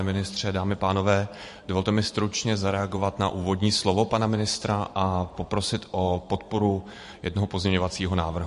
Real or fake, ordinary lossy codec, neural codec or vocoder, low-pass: fake; MP3, 48 kbps; vocoder, 44.1 kHz, 128 mel bands every 512 samples, BigVGAN v2; 14.4 kHz